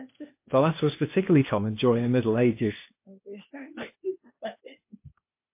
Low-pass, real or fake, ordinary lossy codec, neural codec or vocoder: 3.6 kHz; fake; MP3, 24 kbps; codec, 16 kHz, 0.8 kbps, ZipCodec